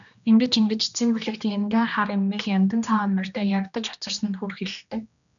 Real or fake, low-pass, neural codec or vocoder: fake; 7.2 kHz; codec, 16 kHz, 1 kbps, X-Codec, HuBERT features, trained on general audio